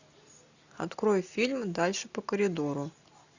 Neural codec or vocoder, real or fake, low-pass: none; real; 7.2 kHz